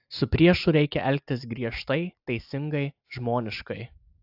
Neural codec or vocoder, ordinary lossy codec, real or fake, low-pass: none; AAC, 48 kbps; real; 5.4 kHz